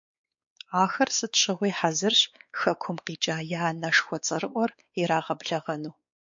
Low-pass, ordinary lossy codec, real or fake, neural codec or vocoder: 7.2 kHz; MP3, 48 kbps; fake; codec, 16 kHz, 4 kbps, X-Codec, WavLM features, trained on Multilingual LibriSpeech